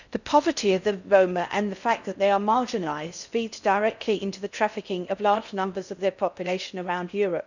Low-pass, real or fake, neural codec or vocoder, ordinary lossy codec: 7.2 kHz; fake; codec, 16 kHz in and 24 kHz out, 0.6 kbps, FocalCodec, streaming, 4096 codes; none